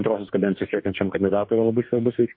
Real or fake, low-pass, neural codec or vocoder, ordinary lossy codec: fake; 5.4 kHz; codec, 44.1 kHz, 2.6 kbps, DAC; MP3, 32 kbps